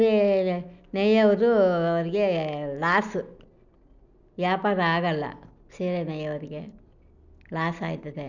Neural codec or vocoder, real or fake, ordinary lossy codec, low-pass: none; real; none; 7.2 kHz